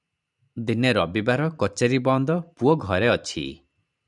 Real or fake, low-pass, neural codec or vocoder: fake; 10.8 kHz; vocoder, 44.1 kHz, 128 mel bands every 512 samples, BigVGAN v2